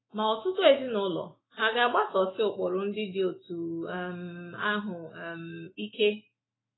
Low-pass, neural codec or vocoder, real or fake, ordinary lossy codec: 7.2 kHz; autoencoder, 48 kHz, 128 numbers a frame, DAC-VAE, trained on Japanese speech; fake; AAC, 16 kbps